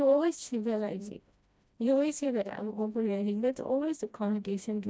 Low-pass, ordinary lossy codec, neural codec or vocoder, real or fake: none; none; codec, 16 kHz, 1 kbps, FreqCodec, smaller model; fake